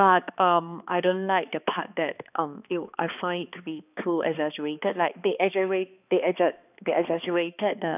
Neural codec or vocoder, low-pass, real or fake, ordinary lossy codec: codec, 16 kHz, 2 kbps, X-Codec, HuBERT features, trained on balanced general audio; 3.6 kHz; fake; none